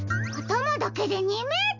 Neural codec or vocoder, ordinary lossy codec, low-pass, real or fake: none; none; 7.2 kHz; real